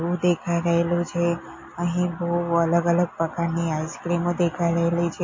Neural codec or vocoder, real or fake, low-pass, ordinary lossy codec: none; real; 7.2 kHz; MP3, 32 kbps